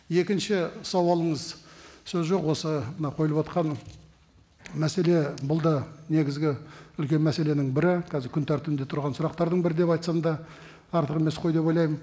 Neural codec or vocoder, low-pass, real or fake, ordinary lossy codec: none; none; real; none